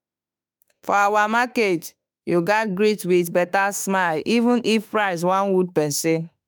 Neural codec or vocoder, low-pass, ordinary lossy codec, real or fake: autoencoder, 48 kHz, 32 numbers a frame, DAC-VAE, trained on Japanese speech; none; none; fake